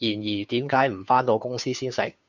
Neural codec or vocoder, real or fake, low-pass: codec, 16 kHz, 2 kbps, FunCodec, trained on Chinese and English, 25 frames a second; fake; 7.2 kHz